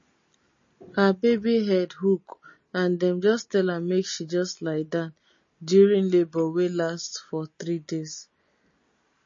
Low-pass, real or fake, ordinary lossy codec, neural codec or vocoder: 7.2 kHz; real; MP3, 32 kbps; none